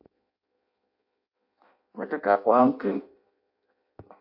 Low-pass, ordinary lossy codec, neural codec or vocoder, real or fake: 5.4 kHz; MP3, 32 kbps; codec, 16 kHz in and 24 kHz out, 0.6 kbps, FireRedTTS-2 codec; fake